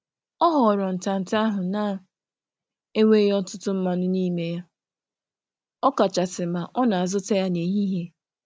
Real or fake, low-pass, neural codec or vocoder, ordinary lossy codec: real; none; none; none